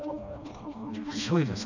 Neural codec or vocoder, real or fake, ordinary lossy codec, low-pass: codec, 16 kHz, 1 kbps, FreqCodec, smaller model; fake; none; 7.2 kHz